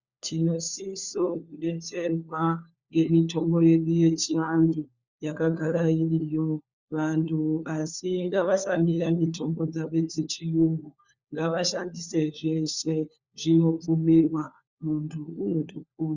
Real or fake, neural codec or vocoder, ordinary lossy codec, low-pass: fake; codec, 16 kHz, 4 kbps, FunCodec, trained on LibriTTS, 50 frames a second; Opus, 64 kbps; 7.2 kHz